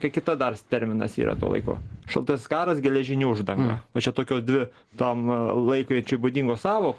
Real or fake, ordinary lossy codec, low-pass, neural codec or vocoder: real; Opus, 16 kbps; 10.8 kHz; none